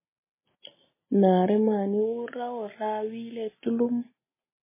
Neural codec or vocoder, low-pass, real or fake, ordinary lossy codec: none; 3.6 kHz; real; MP3, 16 kbps